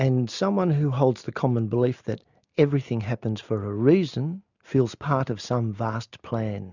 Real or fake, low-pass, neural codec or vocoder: real; 7.2 kHz; none